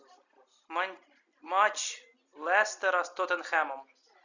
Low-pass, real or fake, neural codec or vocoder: 7.2 kHz; real; none